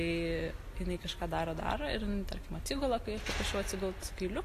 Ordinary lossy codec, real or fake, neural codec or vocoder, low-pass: AAC, 48 kbps; real; none; 14.4 kHz